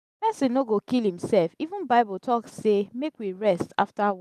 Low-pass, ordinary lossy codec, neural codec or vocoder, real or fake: 14.4 kHz; none; none; real